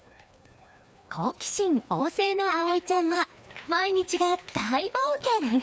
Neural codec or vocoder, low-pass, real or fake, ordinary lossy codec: codec, 16 kHz, 2 kbps, FreqCodec, larger model; none; fake; none